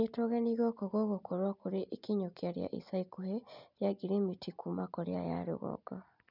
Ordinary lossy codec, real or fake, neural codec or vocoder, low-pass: none; real; none; 5.4 kHz